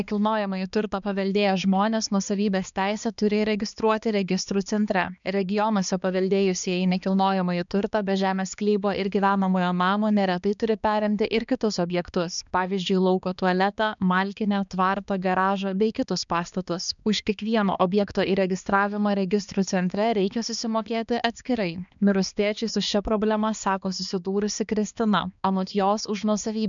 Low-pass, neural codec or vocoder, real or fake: 7.2 kHz; codec, 16 kHz, 2 kbps, X-Codec, HuBERT features, trained on balanced general audio; fake